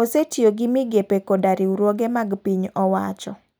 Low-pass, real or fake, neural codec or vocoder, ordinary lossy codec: none; real; none; none